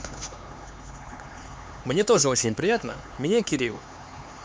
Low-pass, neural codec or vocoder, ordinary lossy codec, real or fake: none; codec, 16 kHz, 4 kbps, X-Codec, HuBERT features, trained on LibriSpeech; none; fake